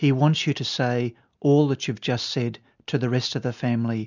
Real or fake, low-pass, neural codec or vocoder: real; 7.2 kHz; none